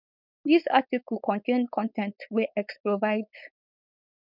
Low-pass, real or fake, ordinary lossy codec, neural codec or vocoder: 5.4 kHz; fake; none; codec, 16 kHz, 4.8 kbps, FACodec